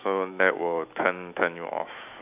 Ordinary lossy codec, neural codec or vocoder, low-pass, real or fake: none; autoencoder, 48 kHz, 128 numbers a frame, DAC-VAE, trained on Japanese speech; 3.6 kHz; fake